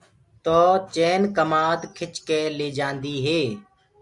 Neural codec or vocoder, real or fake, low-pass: none; real; 10.8 kHz